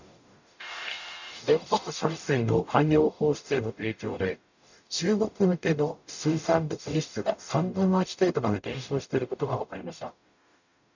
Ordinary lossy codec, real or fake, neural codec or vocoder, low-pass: none; fake; codec, 44.1 kHz, 0.9 kbps, DAC; 7.2 kHz